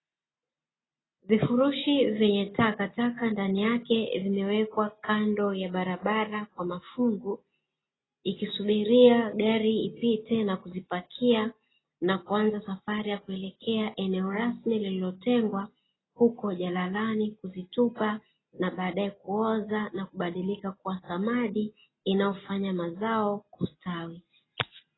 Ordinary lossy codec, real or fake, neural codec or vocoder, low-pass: AAC, 16 kbps; real; none; 7.2 kHz